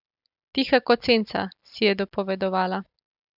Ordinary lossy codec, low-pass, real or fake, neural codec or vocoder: none; 5.4 kHz; real; none